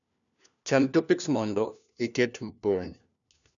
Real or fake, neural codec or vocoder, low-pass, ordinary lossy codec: fake; codec, 16 kHz, 1 kbps, FunCodec, trained on LibriTTS, 50 frames a second; 7.2 kHz; none